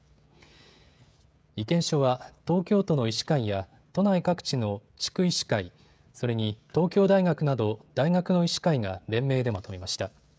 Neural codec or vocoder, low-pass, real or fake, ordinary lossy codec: codec, 16 kHz, 16 kbps, FreqCodec, smaller model; none; fake; none